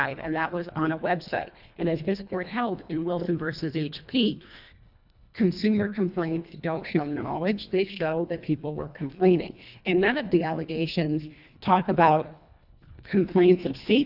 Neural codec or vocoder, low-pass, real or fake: codec, 24 kHz, 1.5 kbps, HILCodec; 5.4 kHz; fake